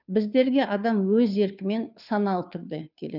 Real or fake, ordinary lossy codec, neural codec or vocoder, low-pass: fake; none; codec, 16 kHz, 2 kbps, FunCodec, trained on Chinese and English, 25 frames a second; 5.4 kHz